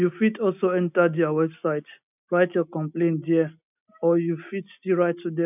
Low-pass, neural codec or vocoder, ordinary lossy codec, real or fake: 3.6 kHz; none; none; real